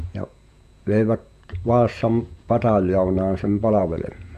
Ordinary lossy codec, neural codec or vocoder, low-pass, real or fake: AAC, 96 kbps; vocoder, 48 kHz, 128 mel bands, Vocos; 14.4 kHz; fake